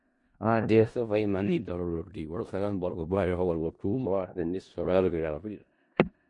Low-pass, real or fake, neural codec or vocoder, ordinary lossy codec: 10.8 kHz; fake; codec, 16 kHz in and 24 kHz out, 0.4 kbps, LongCat-Audio-Codec, four codebook decoder; MP3, 48 kbps